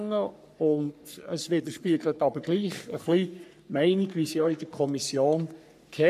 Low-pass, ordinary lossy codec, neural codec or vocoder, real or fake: 14.4 kHz; none; codec, 44.1 kHz, 3.4 kbps, Pupu-Codec; fake